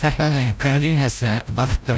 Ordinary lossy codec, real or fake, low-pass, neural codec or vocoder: none; fake; none; codec, 16 kHz, 0.5 kbps, FreqCodec, larger model